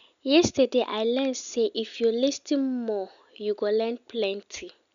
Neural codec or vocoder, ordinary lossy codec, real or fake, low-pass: none; none; real; 7.2 kHz